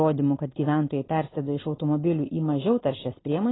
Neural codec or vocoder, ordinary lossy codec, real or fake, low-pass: none; AAC, 16 kbps; real; 7.2 kHz